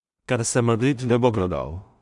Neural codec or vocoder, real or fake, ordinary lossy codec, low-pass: codec, 16 kHz in and 24 kHz out, 0.4 kbps, LongCat-Audio-Codec, two codebook decoder; fake; none; 10.8 kHz